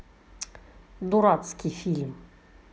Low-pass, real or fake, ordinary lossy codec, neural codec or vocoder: none; real; none; none